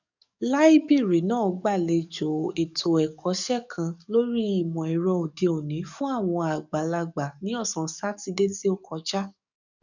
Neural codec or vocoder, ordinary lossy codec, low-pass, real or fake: codec, 44.1 kHz, 7.8 kbps, DAC; none; 7.2 kHz; fake